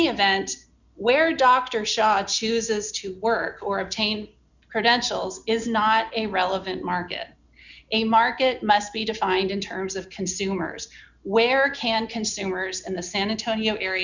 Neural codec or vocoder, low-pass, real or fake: none; 7.2 kHz; real